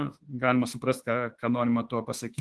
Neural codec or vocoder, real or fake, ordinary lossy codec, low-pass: autoencoder, 48 kHz, 32 numbers a frame, DAC-VAE, trained on Japanese speech; fake; Opus, 16 kbps; 10.8 kHz